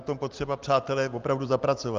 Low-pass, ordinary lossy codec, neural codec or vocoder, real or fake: 7.2 kHz; Opus, 24 kbps; none; real